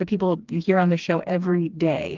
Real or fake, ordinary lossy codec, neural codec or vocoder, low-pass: fake; Opus, 32 kbps; codec, 16 kHz, 2 kbps, FreqCodec, smaller model; 7.2 kHz